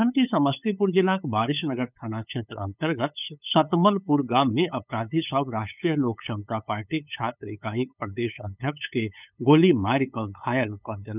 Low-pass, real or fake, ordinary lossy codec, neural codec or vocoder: 3.6 kHz; fake; none; codec, 16 kHz, 8 kbps, FunCodec, trained on LibriTTS, 25 frames a second